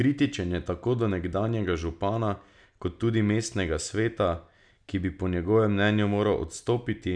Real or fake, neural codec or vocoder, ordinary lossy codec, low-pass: real; none; none; 9.9 kHz